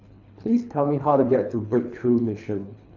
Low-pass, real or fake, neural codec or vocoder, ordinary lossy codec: 7.2 kHz; fake; codec, 24 kHz, 3 kbps, HILCodec; none